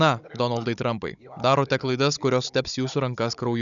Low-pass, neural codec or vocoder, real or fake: 7.2 kHz; codec, 16 kHz, 16 kbps, FunCodec, trained on Chinese and English, 50 frames a second; fake